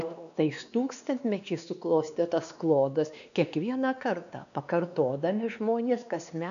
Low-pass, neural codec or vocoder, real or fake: 7.2 kHz; codec, 16 kHz, 2 kbps, X-Codec, WavLM features, trained on Multilingual LibriSpeech; fake